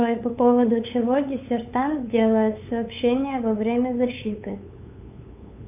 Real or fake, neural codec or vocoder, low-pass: fake; codec, 16 kHz, 8 kbps, FunCodec, trained on LibriTTS, 25 frames a second; 3.6 kHz